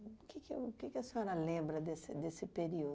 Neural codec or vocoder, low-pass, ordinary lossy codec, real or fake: none; none; none; real